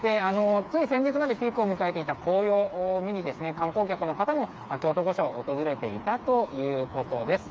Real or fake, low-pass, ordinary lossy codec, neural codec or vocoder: fake; none; none; codec, 16 kHz, 4 kbps, FreqCodec, smaller model